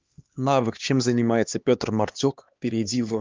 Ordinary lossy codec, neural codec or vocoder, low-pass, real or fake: Opus, 32 kbps; codec, 16 kHz, 2 kbps, X-Codec, HuBERT features, trained on LibriSpeech; 7.2 kHz; fake